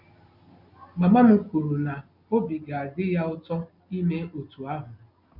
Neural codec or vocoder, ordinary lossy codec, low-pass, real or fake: none; none; 5.4 kHz; real